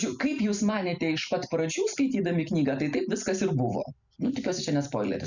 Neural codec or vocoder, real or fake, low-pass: vocoder, 44.1 kHz, 128 mel bands every 256 samples, BigVGAN v2; fake; 7.2 kHz